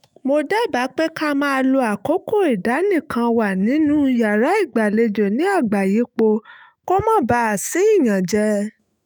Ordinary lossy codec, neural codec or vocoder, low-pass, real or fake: none; autoencoder, 48 kHz, 128 numbers a frame, DAC-VAE, trained on Japanese speech; none; fake